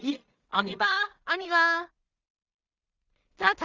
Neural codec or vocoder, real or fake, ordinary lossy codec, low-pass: codec, 16 kHz in and 24 kHz out, 0.4 kbps, LongCat-Audio-Codec, two codebook decoder; fake; Opus, 32 kbps; 7.2 kHz